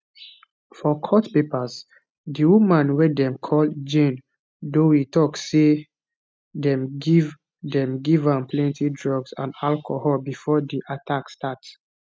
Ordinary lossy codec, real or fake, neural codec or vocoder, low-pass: none; real; none; none